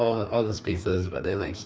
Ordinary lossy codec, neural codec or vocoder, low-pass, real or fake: none; codec, 16 kHz, 2 kbps, FreqCodec, larger model; none; fake